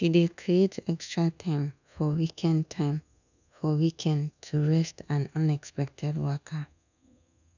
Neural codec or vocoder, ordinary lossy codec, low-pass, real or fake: codec, 24 kHz, 1.2 kbps, DualCodec; none; 7.2 kHz; fake